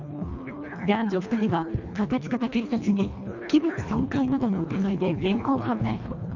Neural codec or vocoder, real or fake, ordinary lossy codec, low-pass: codec, 24 kHz, 1.5 kbps, HILCodec; fake; none; 7.2 kHz